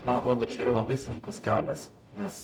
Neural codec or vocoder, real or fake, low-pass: codec, 44.1 kHz, 0.9 kbps, DAC; fake; 19.8 kHz